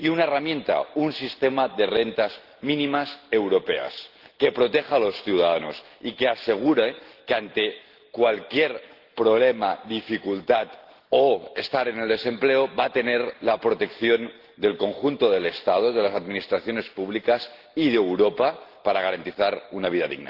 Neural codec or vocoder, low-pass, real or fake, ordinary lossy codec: none; 5.4 kHz; real; Opus, 16 kbps